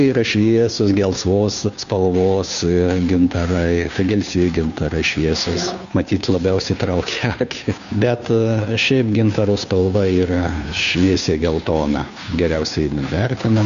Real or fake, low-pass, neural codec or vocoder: fake; 7.2 kHz; codec, 16 kHz, 2 kbps, FunCodec, trained on Chinese and English, 25 frames a second